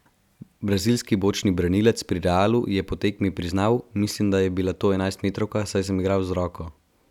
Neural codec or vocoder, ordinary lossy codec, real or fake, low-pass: none; none; real; 19.8 kHz